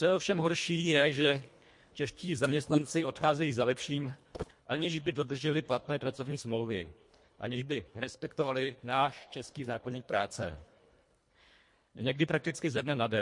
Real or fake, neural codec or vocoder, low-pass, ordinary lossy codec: fake; codec, 24 kHz, 1.5 kbps, HILCodec; 10.8 kHz; MP3, 48 kbps